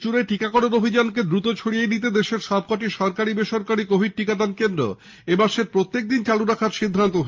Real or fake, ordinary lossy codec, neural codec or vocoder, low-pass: real; Opus, 24 kbps; none; 7.2 kHz